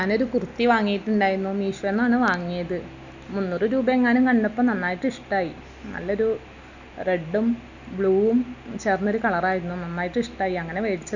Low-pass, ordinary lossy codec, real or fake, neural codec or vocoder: 7.2 kHz; none; real; none